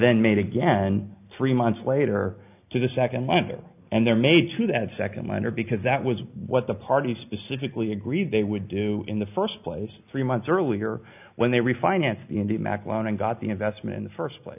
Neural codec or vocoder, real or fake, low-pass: none; real; 3.6 kHz